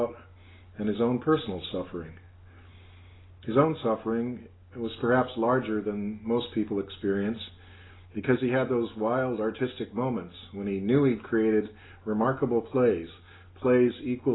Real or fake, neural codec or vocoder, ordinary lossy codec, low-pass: real; none; AAC, 16 kbps; 7.2 kHz